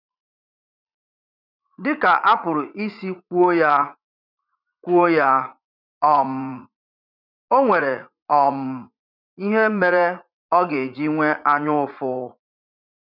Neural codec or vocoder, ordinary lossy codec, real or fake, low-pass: vocoder, 44.1 kHz, 80 mel bands, Vocos; none; fake; 5.4 kHz